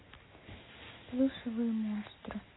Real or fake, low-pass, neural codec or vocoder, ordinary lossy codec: real; 7.2 kHz; none; AAC, 16 kbps